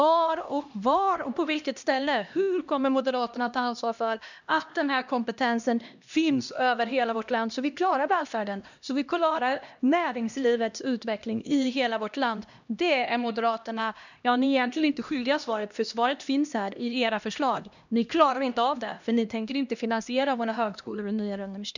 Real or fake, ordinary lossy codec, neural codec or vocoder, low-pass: fake; none; codec, 16 kHz, 1 kbps, X-Codec, HuBERT features, trained on LibriSpeech; 7.2 kHz